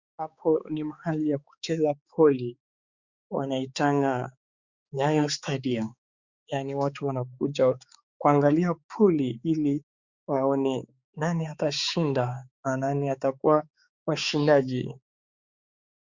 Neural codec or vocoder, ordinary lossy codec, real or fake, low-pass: codec, 16 kHz, 4 kbps, X-Codec, HuBERT features, trained on balanced general audio; Opus, 64 kbps; fake; 7.2 kHz